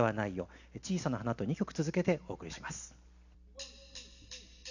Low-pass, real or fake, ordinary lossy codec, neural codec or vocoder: 7.2 kHz; real; none; none